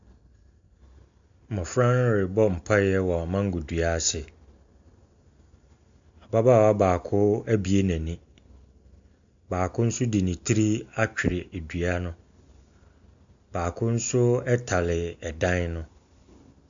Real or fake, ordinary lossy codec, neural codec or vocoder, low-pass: real; AAC, 64 kbps; none; 7.2 kHz